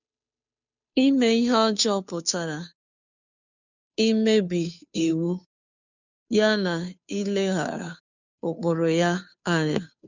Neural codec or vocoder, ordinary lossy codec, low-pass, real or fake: codec, 16 kHz, 2 kbps, FunCodec, trained on Chinese and English, 25 frames a second; none; 7.2 kHz; fake